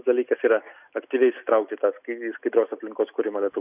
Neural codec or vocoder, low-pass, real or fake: none; 3.6 kHz; real